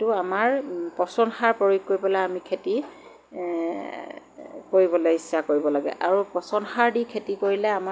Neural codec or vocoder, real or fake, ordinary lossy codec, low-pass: none; real; none; none